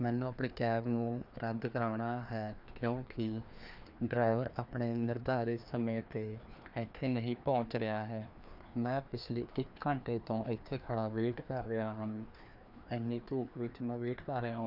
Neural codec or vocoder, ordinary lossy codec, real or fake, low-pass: codec, 16 kHz, 2 kbps, FreqCodec, larger model; none; fake; 5.4 kHz